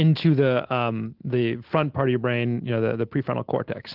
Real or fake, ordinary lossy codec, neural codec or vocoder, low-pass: real; Opus, 16 kbps; none; 5.4 kHz